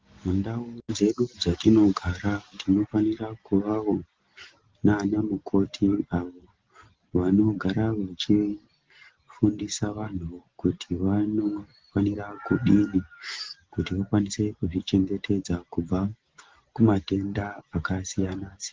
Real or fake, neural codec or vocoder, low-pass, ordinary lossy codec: real; none; 7.2 kHz; Opus, 16 kbps